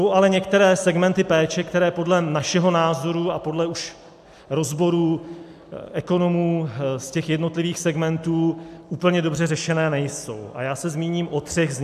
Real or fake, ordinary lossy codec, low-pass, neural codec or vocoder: real; AAC, 96 kbps; 14.4 kHz; none